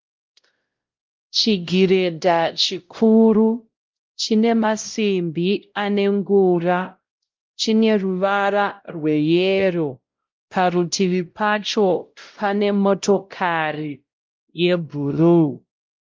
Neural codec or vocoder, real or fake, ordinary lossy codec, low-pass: codec, 16 kHz, 0.5 kbps, X-Codec, WavLM features, trained on Multilingual LibriSpeech; fake; Opus, 24 kbps; 7.2 kHz